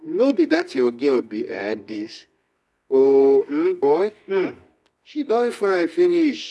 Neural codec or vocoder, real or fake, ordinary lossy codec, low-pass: codec, 24 kHz, 0.9 kbps, WavTokenizer, medium music audio release; fake; none; none